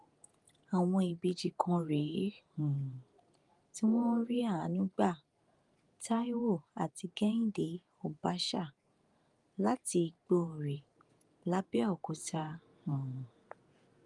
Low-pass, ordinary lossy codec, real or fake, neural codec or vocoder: 10.8 kHz; Opus, 32 kbps; fake; vocoder, 48 kHz, 128 mel bands, Vocos